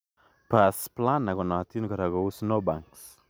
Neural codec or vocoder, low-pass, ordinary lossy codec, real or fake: none; none; none; real